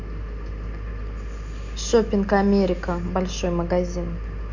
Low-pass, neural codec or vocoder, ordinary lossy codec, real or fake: 7.2 kHz; none; none; real